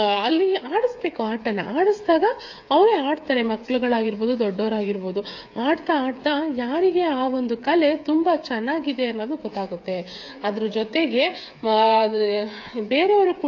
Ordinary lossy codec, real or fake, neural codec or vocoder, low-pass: AAC, 48 kbps; fake; codec, 16 kHz, 8 kbps, FreqCodec, smaller model; 7.2 kHz